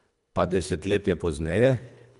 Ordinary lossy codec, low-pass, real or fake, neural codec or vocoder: none; 10.8 kHz; fake; codec, 24 kHz, 1.5 kbps, HILCodec